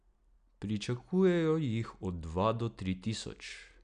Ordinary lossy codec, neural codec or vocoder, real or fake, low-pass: none; none; real; 9.9 kHz